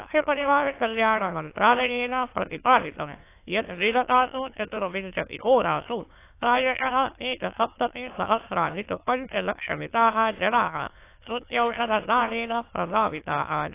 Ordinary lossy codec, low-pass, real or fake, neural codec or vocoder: AAC, 24 kbps; 3.6 kHz; fake; autoencoder, 22.05 kHz, a latent of 192 numbers a frame, VITS, trained on many speakers